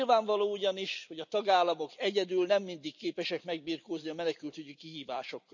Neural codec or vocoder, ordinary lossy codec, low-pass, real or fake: none; none; 7.2 kHz; real